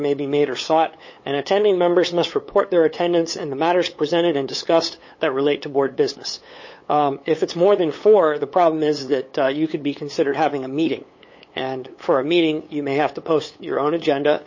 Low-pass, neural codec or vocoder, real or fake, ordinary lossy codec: 7.2 kHz; codec, 16 kHz, 8 kbps, FunCodec, trained on LibriTTS, 25 frames a second; fake; MP3, 32 kbps